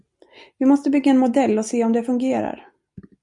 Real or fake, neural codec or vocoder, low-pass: real; none; 10.8 kHz